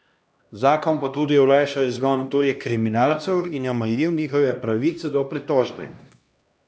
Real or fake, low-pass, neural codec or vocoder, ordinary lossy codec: fake; none; codec, 16 kHz, 1 kbps, X-Codec, HuBERT features, trained on LibriSpeech; none